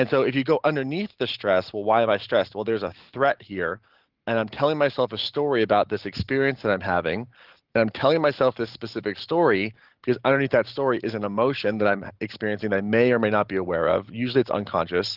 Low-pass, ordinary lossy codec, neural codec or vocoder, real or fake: 5.4 kHz; Opus, 16 kbps; none; real